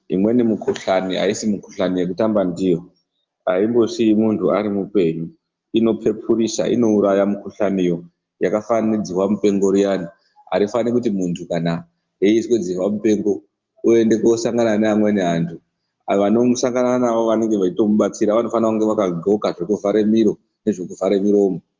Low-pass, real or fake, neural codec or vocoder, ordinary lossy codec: 7.2 kHz; real; none; Opus, 32 kbps